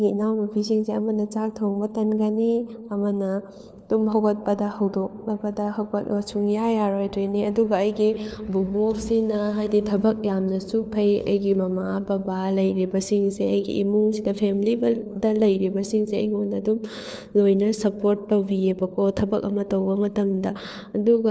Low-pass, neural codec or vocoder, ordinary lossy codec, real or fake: none; codec, 16 kHz, 4 kbps, FunCodec, trained on LibriTTS, 50 frames a second; none; fake